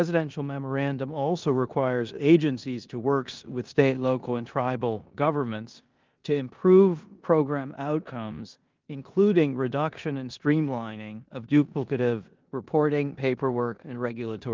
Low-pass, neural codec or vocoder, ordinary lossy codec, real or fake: 7.2 kHz; codec, 16 kHz in and 24 kHz out, 0.9 kbps, LongCat-Audio-Codec, four codebook decoder; Opus, 32 kbps; fake